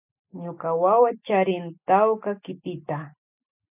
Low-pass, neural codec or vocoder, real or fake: 3.6 kHz; none; real